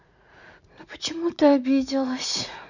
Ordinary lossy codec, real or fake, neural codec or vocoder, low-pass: none; fake; vocoder, 44.1 kHz, 80 mel bands, Vocos; 7.2 kHz